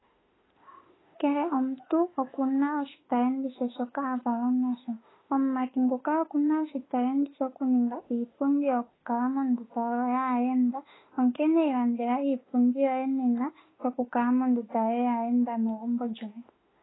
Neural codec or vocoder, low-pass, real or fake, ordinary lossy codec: autoencoder, 48 kHz, 32 numbers a frame, DAC-VAE, trained on Japanese speech; 7.2 kHz; fake; AAC, 16 kbps